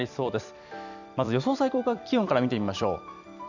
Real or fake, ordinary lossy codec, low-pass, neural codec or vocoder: fake; none; 7.2 kHz; vocoder, 44.1 kHz, 128 mel bands every 256 samples, BigVGAN v2